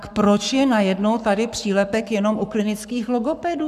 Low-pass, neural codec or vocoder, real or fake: 14.4 kHz; codec, 44.1 kHz, 7.8 kbps, Pupu-Codec; fake